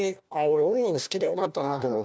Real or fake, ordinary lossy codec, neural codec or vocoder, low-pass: fake; none; codec, 16 kHz, 1 kbps, FunCodec, trained on LibriTTS, 50 frames a second; none